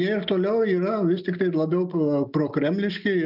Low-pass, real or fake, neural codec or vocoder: 5.4 kHz; real; none